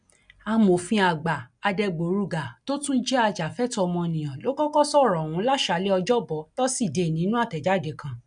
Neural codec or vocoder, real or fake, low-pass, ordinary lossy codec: none; real; 9.9 kHz; none